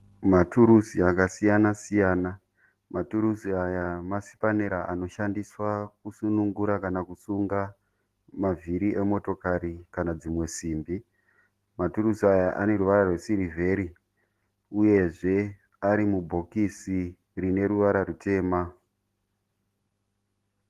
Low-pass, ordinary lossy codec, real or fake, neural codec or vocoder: 14.4 kHz; Opus, 16 kbps; real; none